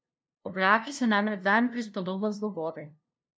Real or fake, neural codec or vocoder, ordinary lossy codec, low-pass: fake; codec, 16 kHz, 0.5 kbps, FunCodec, trained on LibriTTS, 25 frames a second; none; none